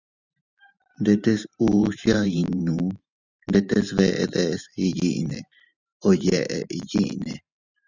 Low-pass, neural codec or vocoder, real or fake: 7.2 kHz; vocoder, 44.1 kHz, 128 mel bands every 512 samples, BigVGAN v2; fake